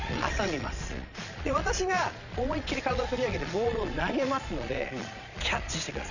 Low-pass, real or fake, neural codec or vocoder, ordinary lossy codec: 7.2 kHz; fake; vocoder, 22.05 kHz, 80 mel bands, Vocos; none